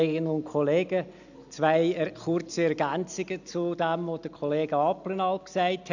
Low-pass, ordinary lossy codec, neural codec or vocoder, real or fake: 7.2 kHz; none; none; real